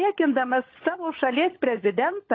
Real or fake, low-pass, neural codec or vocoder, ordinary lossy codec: fake; 7.2 kHz; codec, 16 kHz, 16 kbps, FunCodec, trained on LibriTTS, 50 frames a second; AAC, 32 kbps